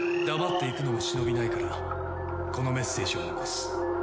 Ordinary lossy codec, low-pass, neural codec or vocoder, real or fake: none; none; none; real